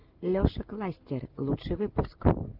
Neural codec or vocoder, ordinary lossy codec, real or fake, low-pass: none; Opus, 32 kbps; real; 5.4 kHz